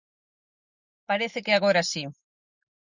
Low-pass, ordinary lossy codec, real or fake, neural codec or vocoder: 7.2 kHz; Opus, 64 kbps; real; none